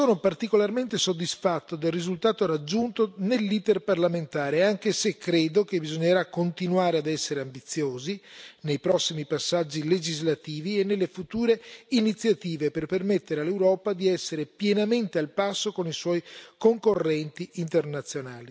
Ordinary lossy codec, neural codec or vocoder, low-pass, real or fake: none; none; none; real